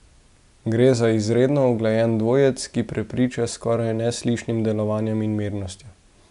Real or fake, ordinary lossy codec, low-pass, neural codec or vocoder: real; none; 10.8 kHz; none